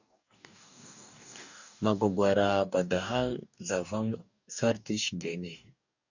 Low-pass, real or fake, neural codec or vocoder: 7.2 kHz; fake; codec, 44.1 kHz, 2.6 kbps, DAC